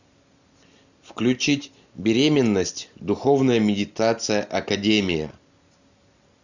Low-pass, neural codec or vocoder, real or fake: 7.2 kHz; none; real